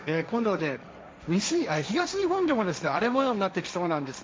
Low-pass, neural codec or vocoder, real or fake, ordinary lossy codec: none; codec, 16 kHz, 1.1 kbps, Voila-Tokenizer; fake; none